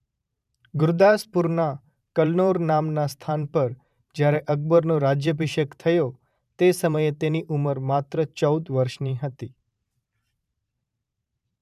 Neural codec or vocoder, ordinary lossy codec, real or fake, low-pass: vocoder, 44.1 kHz, 128 mel bands every 512 samples, BigVGAN v2; none; fake; 14.4 kHz